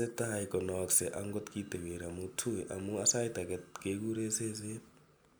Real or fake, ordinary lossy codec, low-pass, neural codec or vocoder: real; none; none; none